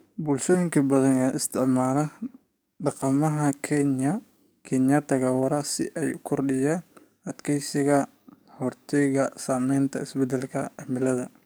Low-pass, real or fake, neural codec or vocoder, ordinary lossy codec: none; fake; codec, 44.1 kHz, 7.8 kbps, DAC; none